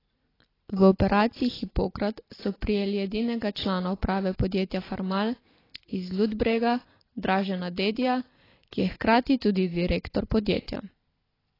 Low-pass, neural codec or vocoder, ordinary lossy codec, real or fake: 5.4 kHz; none; AAC, 24 kbps; real